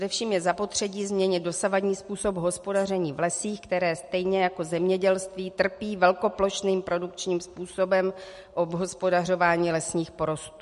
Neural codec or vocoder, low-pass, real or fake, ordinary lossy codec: none; 14.4 kHz; real; MP3, 48 kbps